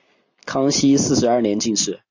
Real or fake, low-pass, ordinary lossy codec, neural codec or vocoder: real; 7.2 kHz; AAC, 48 kbps; none